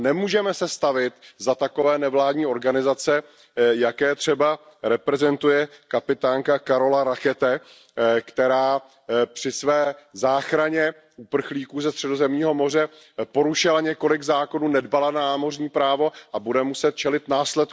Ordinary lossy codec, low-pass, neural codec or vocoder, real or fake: none; none; none; real